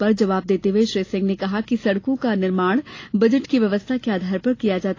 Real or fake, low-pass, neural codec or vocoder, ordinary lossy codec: real; 7.2 kHz; none; AAC, 32 kbps